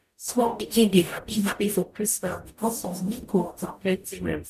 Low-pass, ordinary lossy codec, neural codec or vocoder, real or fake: 14.4 kHz; none; codec, 44.1 kHz, 0.9 kbps, DAC; fake